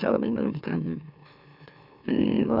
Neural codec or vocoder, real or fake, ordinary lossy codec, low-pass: autoencoder, 44.1 kHz, a latent of 192 numbers a frame, MeloTTS; fake; none; 5.4 kHz